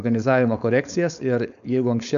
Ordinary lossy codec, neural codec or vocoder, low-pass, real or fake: AAC, 96 kbps; codec, 16 kHz, 4.8 kbps, FACodec; 7.2 kHz; fake